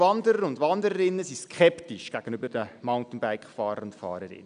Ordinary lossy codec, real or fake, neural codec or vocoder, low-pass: none; real; none; 9.9 kHz